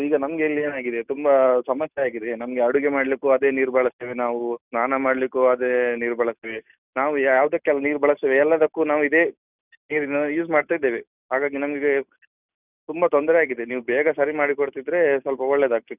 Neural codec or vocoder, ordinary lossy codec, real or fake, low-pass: none; none; real; 3.6 kHz